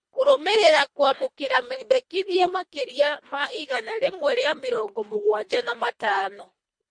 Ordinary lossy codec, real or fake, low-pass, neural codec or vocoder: MP3, 48 kbps; fake; 9.9 kHz; codec, 24 kHz, 1.5 kbps, HILCodec